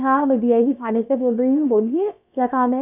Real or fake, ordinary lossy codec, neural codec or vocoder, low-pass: fake; none; codec, 16 kHz, about 1 kbps, DyCAST, with the encoder's durations; 3.6 kHz